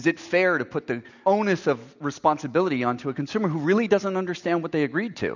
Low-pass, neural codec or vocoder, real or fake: 7.2 kHz; none; real